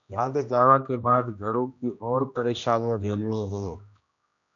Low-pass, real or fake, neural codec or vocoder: 7.2 kHz; fake; codec, 16 kHz, 1 kbps, X-Codec, HuBERT features, trained on general audio